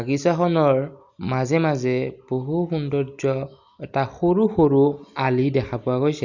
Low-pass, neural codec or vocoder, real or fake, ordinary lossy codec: 7.2 kHz; none; real; none